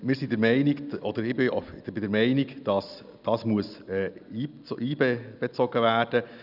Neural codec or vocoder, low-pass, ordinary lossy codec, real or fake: none; 5.4 kHz; none; real